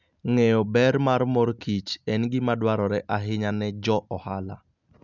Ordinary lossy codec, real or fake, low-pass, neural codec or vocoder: none; real; 7.2 kHz; none